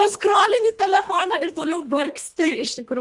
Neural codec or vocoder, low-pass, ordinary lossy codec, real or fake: codec, 24 kHz, 1.5 kbps, HILCodec; 10.8 kHz; Opus, 64 kbps; fake